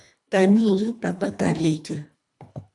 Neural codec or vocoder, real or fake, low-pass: codec, 24 kHz, 1.5 kbps, HILCodec; fake; 10.8 kHz